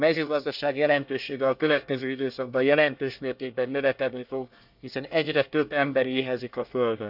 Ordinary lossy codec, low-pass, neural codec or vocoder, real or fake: none; 5.4 kHz; codec, 24 kHz, 1 kbps, SNAC; fake